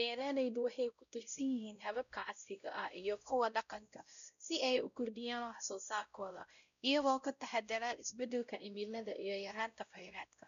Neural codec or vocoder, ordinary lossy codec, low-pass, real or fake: codec, 16 kHz, 0.5 kbps, X-Codec, WavLM features, trained on Multilingual LibriSpeech; none; 7.2 kHz; fake